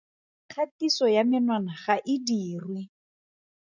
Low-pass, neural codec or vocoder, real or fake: 7.2 kHz; none; real